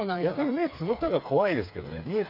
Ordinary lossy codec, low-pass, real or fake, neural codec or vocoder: none; 5.4 kHz; fake; codec, 16 kHz, 4 kbps, FreqCodec, smaller model